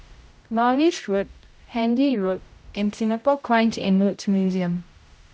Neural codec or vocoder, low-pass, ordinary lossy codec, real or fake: codec, 16 kHz, 0.5 kbps, X-Codec, HuBERT features, trained on general audio; none; none; fake